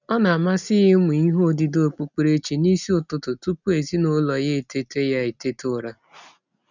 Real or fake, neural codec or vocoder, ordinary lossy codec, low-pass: real; none; none; 7.2 kHz